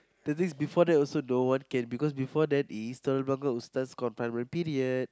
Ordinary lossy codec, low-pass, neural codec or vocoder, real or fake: none; none; none; real